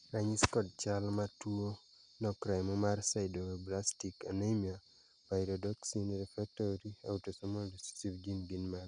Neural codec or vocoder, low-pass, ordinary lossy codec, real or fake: none; 9.9 kHz; Opus, 64 kbps; real